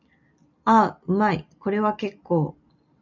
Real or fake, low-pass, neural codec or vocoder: real; 7.2 kHz; none